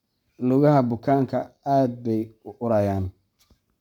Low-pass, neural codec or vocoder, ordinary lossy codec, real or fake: 19.8 kHz; codec, 44.1 kHz, 7.8 kbps, Pupu-Codec; none; fake